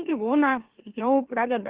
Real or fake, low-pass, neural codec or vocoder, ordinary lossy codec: fake; 3.6 kHz; autoencoder, 44.1 kHz, a latent of 192 numbers a frame, MeloTTS; Opus, 16 kbps